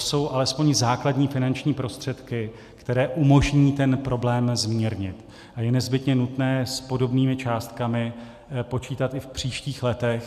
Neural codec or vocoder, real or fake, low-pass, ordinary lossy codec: none; real; 14.4 kHz; MP3, 96 kbps